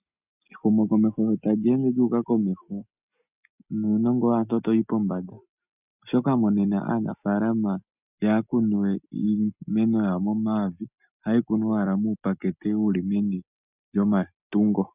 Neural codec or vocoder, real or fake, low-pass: none; real; 3.6 kHz